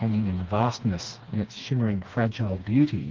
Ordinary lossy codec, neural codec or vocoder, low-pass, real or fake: Opus, 32 kbps; codec, 16 kHz, 2 kbps, FreqCodec, smaller model; 7.2 kHz; fake